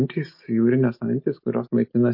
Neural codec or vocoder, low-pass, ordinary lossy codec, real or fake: none; 5.4 kHz; MP3, 32 kbps; real